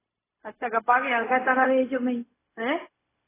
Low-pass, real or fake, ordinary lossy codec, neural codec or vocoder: 3.6 kHz; fake; AAC, 16 kbps; codec, 16 kHz, 0.4 kbps, LongCat-Audio-Codec